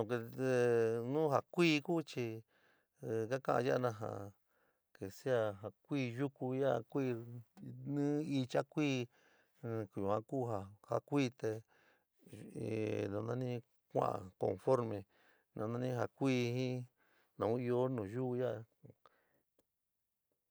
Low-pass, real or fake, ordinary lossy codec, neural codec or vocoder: none; real; none; none